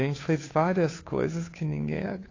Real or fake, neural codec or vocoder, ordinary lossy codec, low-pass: fake; codec, 16 kHz, 2 kbps, FunCodec, trained on Chinese and English, 25 frames a second; AAC, 32 kbps; 7.2 kHz